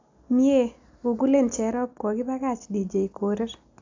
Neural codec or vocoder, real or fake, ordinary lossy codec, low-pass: none; real; AAC, 48 kbps; 7.2 kHz